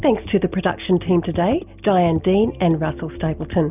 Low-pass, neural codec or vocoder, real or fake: 3.6 kHz; none; real